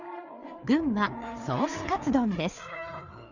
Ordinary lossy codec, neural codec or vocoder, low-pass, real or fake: none; codec, 16 kHz, 4 kbps, FreqCodec, larger model; 7.2 kHz; fake